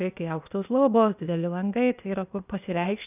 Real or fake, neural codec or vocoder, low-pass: fake; codec, 16 kHz, 0.8 kbps, ZipCodec; 3.6 kHz